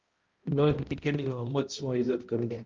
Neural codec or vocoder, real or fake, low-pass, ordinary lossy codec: codec, 16 kHz, 0.5 kbps, X-Codec, HuBERT features, trained on balanced general audio; fake; 7.2 kHz; Opus, 32 kbps